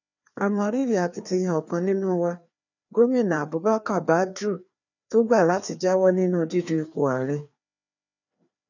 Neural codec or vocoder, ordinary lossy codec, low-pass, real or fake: codec, 16 kHz, 2 kbps, FreqCodec, larger model; none; 7.2 kHz; fake